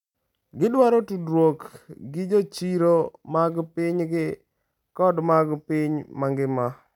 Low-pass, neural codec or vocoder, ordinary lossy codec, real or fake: 19.8 kHz; none; none; real